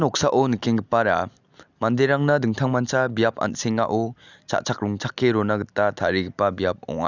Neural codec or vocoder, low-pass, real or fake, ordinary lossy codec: none; 7.2 kHz; real; none